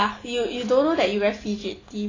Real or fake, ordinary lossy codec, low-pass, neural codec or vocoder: real; AAC, 32 kbps; 7.2 kHz; none